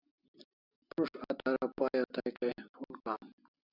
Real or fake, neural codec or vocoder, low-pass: real; none; 5.4 kHz